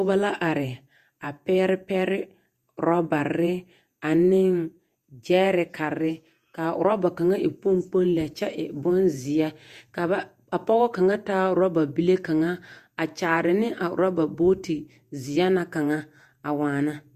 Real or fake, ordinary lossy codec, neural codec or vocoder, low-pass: fake; Opus, 64 kbps; vocoder, 48 kHz, 128 mel bands, Vocos; 14.4 kHz